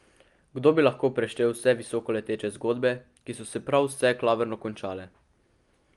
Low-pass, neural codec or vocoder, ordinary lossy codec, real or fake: 10.8 kHz; none; Opus, 32 kbps; real